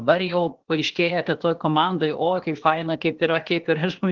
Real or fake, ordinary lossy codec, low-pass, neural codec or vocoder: fake; Opus, 16 kbps; 7.2 kHz; codec, 16 kHz, 0.8 kbps, ZipCodec